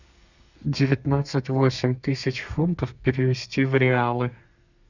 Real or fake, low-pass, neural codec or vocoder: fake; 7.2 kHz; codec, 32 kHz, 1.9 kbps, SNAC